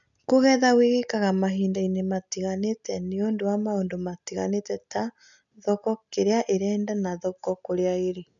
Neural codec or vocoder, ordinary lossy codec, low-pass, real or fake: none; none; 7.2 kHz; real